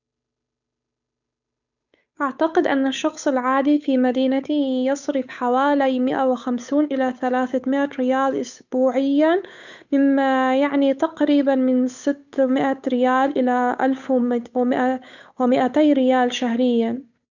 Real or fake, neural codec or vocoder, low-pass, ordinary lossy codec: fake; codec, 16 kHz, 8 kbps, FunCodec, trained on Chinese and English, 25 frames a second; 7.2 kHz; none